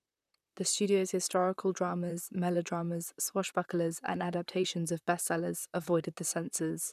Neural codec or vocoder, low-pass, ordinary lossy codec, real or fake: vocoder, 44.1 kHz, 128 mel bands, Pupu-Vocoder; 14.4 kHz; none; fake